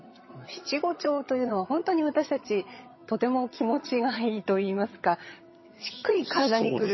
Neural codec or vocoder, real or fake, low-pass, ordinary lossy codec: vocoder, 22.05 kHz, 80 mel bands, HiFi-GAN; fake; 7.2 kHz; MP3, 24 kbps